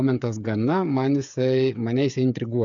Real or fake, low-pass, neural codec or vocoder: fake; 7.2 kHz; codec, 16 kHz, 8 kbps, FreqCodec, smaller model